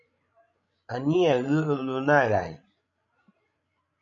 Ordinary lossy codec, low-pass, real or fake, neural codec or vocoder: MP3, 64 kbps; 7.2 kHz; fake; codec, 16 kHz, 16 kbps, FreqCodec, larger model